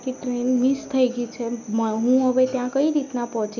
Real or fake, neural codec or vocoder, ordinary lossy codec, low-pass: real; none; none; 7.2 kHz